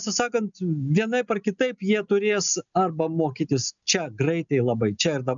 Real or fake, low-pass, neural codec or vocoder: real; 7.2 kHz; none